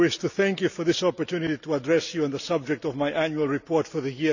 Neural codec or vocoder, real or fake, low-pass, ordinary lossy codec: none; real; 7.2 kHz; none